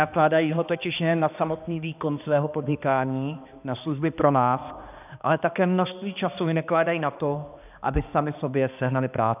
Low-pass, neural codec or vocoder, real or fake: 3.6 kHz; codec, 16 kHz, 2 kbps, X-Codec, HuBERT features, trained on balanced general audio; fake